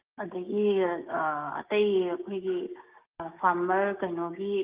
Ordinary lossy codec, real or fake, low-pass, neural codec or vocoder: Opus, 32 kbps; fake; 3.6 kHz; codec, 16 kHz, 6 kbps, DAC